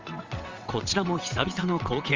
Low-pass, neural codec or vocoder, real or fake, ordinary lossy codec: 7.2 kHz; vocoder, 22.05 kHz, 80 mel bands, WaveNeXt; fake; Opus, 32 kbps